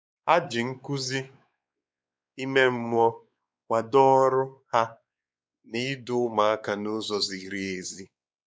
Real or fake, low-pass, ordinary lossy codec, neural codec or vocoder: fake; none; none; codec, 16 kHz, 4 kbps, X-Codec, WavLM features, trained on Multilingual LibriSpeech